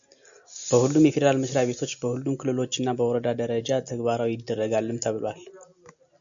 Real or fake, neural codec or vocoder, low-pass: real; none; 7.2 kHz